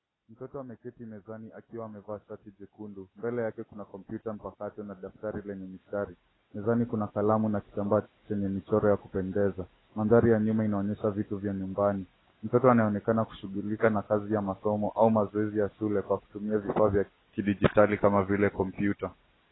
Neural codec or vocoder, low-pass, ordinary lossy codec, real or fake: none; 7.2 kHz; AAC, 16 kbps; real